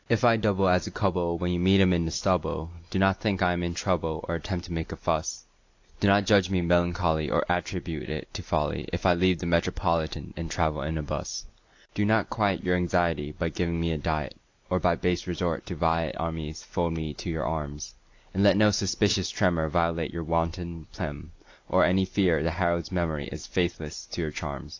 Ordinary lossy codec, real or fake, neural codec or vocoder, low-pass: AAC, 48 kbps; real; none; 7.2 kHz